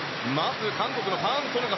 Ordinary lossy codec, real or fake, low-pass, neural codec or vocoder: MP3, 24 kbps; real; 7.2 kHz; none